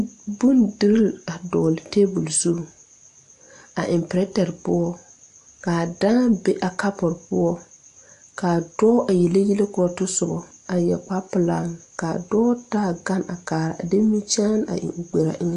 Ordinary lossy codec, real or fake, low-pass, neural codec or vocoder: AAC, 64 kbps; real; 10.8 kHz; none